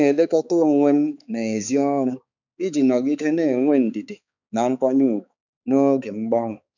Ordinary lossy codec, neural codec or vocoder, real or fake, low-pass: none; codec, 16 kHz, 4 kbps, X-Codec, HuBERT features, trained on balanced general audio; fake; 7.2 kHz